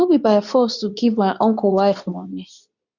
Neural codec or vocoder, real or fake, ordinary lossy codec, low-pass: codec, 24 kHz, 0.9 kbps, WavTokenizer, medium speech release version 2; fake; none; 7.2 kHz